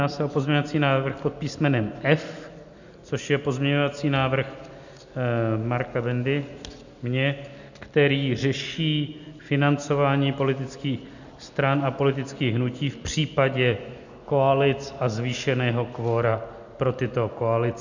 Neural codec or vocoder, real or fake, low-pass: none; real; 7.2 kHz